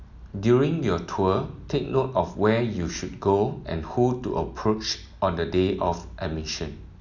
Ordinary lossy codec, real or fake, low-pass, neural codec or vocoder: none; real; 7.2 kHz; none